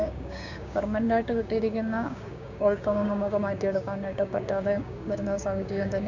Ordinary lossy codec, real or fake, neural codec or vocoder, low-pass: none; fake; codec, 16 kHz, 6 kbps, DAC; 7.2 kHz